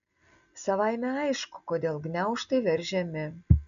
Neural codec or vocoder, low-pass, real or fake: none; 7.2 kHz; real